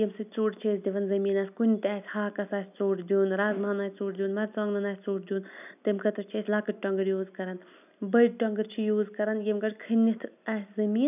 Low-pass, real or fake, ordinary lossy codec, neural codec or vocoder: 3.6 kHz; real; none; none